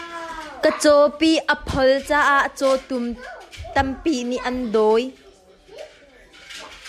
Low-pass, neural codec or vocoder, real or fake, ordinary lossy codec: 14.4 kHz; none; real; AAC, 96 kbps